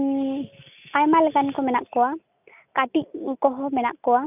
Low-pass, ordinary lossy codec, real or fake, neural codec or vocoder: 3.6 kHz; none; real; none